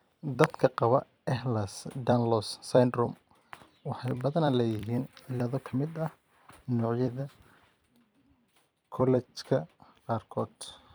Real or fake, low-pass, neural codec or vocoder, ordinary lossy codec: fake; none; vocoder, 44.1 kHz, 128 mel bands every 256 samples, BigVGAN v2; none